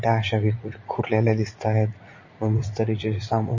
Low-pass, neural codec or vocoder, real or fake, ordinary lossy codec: 7.2 kHz; vocoder, 44.1 kHz, 80 mel bands, Vocos; fake; MP3, 32 kbps